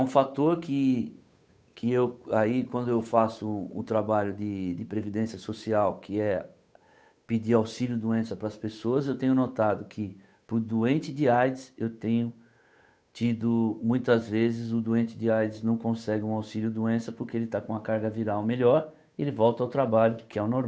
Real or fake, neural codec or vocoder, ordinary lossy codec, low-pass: fake; codec, 16 kHz, 8 kbps, FunCodec, trained on Chinese and English, 25 frames a second; none; none